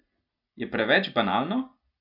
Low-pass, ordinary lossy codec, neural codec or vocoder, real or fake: 5.4 kHz; none; none; real